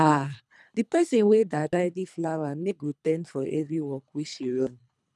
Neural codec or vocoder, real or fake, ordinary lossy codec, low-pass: codec, 24 kHz, 3 kbps, HILCodec; fake; none; none